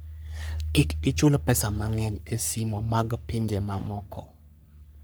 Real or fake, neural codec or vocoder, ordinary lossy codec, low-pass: fake; codec, 44.1 kHz, 3.4 kbps, Pupu-Codec; none; none